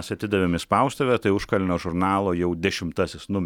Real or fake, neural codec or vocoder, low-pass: real; none; 19.8 kHz